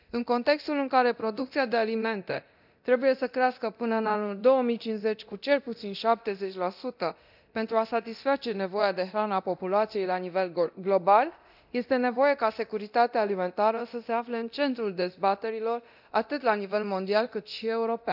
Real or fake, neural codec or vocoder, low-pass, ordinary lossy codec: fake; codec, 24 kHz, 0.9 kbps, DualCodec; 5.4 kHz; none